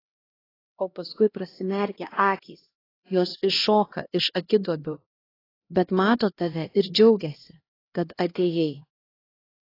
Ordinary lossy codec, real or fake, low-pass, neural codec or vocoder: AAC, 24 kbps; fake; 5.4 kHz; codec, 16 kHz, 1 kbps, X-Codec, HuBERT features, trained on LibriSpeech